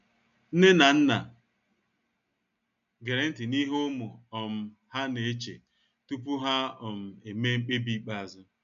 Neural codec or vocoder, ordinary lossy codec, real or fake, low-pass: none; none; real; 7.2 kHz